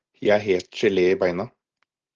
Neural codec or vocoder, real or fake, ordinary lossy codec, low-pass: none; real; Opus, 16 kbps; 7.2 kHz